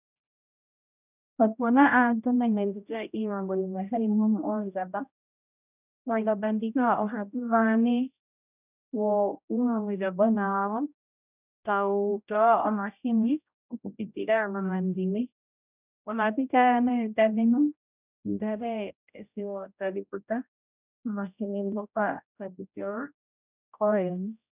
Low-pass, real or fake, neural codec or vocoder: 3.6 kHz; fake; codec, 16 kHz, 0.5 kbps, X-Codec, HuBERT features, trained on general audio